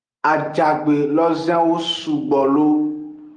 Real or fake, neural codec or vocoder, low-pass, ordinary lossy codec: real; none; 9.9 kHz; Opus, 32 kbps